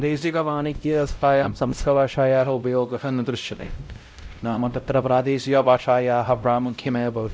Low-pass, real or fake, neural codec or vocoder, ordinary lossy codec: none; fake; codec, 16 kHz, 0.5 kbps, X-Codec, WavLM features, trained on Multilingual LibriSpeech; none